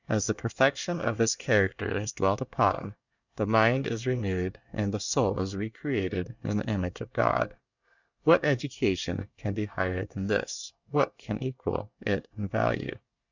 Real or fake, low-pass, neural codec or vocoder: fake; 7.2 kHz; codec, 24 kHz, 1 kbps, SNAC